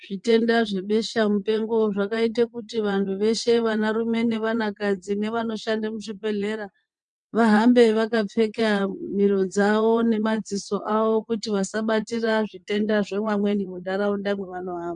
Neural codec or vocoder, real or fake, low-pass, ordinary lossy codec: vocoder, 22.05 kHz, 80 mel bands, WaveNeXt; fake; 9.9 kHz; MP3, 64 kbps